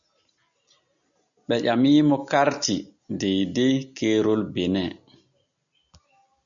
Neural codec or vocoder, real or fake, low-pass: none; real; 7.2 kHz